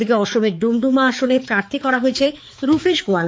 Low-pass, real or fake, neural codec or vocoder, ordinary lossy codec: none; fake; codec, 16 kHz, 4 kbps, X-Codec, HuBERT features, trained on balanced general audio; none